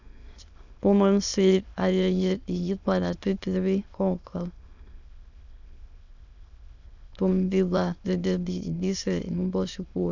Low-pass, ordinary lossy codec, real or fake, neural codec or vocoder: 7.2 kHz; none; fake; autoencoder, 22.05 kHz, a latent of 192 numbers a frame, VITS, trained on many speakers